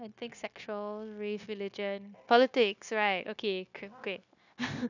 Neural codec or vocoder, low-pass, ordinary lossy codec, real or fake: codec, 16 kHz, 0.9 kbps, LongCat-Audio-Codec; 7.2 kHz; none; fake